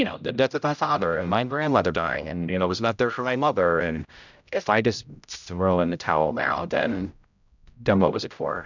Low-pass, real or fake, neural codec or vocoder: 7.2 kHz; fake; codec, 16 kHz, 0.5 kbps, X-Codec, HuBERT features, trained on general audio